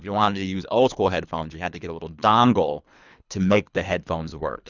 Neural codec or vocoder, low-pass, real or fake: codec, 24 kHz, 3 kbps, HILCodec; 7.2 kHz; fake